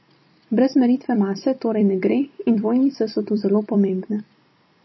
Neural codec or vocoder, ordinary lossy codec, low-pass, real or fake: vocoder, 44.1 kHz, 128 mel bands every 256 samples, BigVGAN v2; MP3, 24 kbps; 7.2 kHz; fake